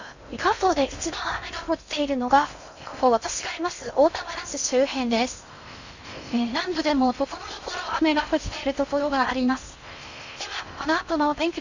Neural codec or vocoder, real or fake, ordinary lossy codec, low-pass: codec, 16 kHz in and 24 kHz out, 0.6 kbps, FocalCodec, streaming, 2048 codes; fake; Opus, 64 kbps; 7.2 kHz